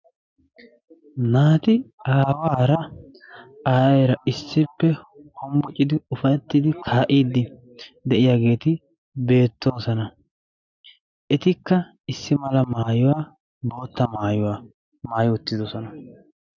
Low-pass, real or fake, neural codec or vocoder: 7.2 kHz; real; none